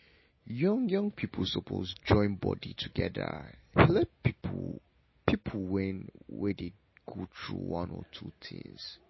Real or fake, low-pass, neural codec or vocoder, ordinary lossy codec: real; 7.2 kHz; none; MP3, 24 kbps